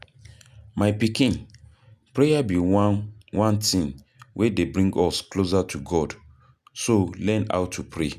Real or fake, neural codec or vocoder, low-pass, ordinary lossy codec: real; none; 14.4 kHz; none